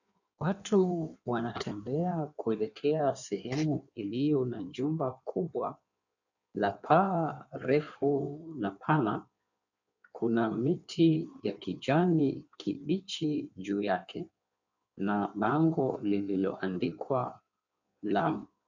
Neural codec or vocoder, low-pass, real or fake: codec, 16 kHz in and 24 kHz out, 1.1 kbps, FireRedTTS-2 codec; 7.2 kHz; fake